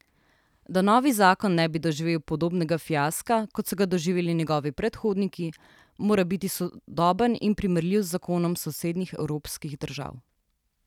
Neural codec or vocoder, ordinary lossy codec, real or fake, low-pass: none; none; real; 19.8 kHz